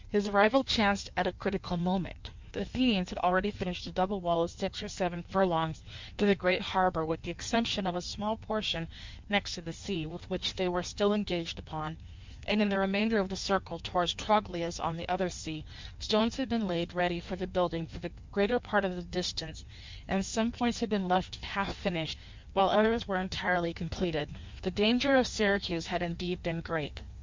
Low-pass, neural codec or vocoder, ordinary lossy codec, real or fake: 7.2 kHz; codec, 16 kHz in and 24 kHz out, 1.1 kbps, FireRedTTS-2 codec; MP3, 64 kbps; fake